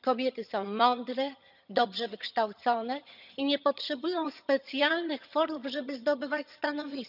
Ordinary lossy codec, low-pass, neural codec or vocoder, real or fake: none; 5.4 kHz; vocoder, 22.05 kHz, 80 mel bands, HiFi-GAN; fake